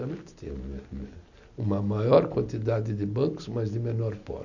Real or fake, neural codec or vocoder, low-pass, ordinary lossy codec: real; none; 7.2 kHz; none